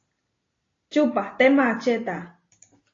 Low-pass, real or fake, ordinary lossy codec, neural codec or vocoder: 7.2 kHz; real; AAC, 32 kbps; none